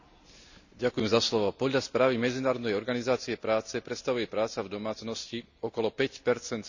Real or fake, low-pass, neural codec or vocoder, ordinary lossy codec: real; 7.2 kHz; none; none